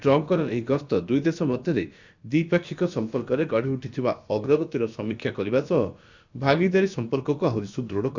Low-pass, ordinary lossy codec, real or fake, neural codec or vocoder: 7.2 kHz; Opus, 64 kbps; fake; codec, 16 kHz, about 1 kbps, DyCAST, with the encoder's durations